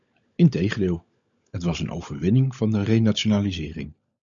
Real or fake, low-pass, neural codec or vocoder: fake; 7.2 kHz; codec, 16 kHz, 16 kbps, FunCodec, trained on LibriTTS, 50 frames a second